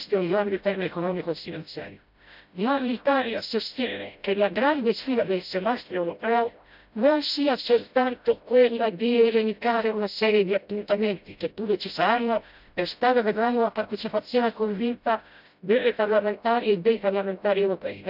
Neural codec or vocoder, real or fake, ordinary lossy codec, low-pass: codec, 16 kHz, 0.5 kbps, FreqCodec, smaller model; fake; none; 5.4 kHz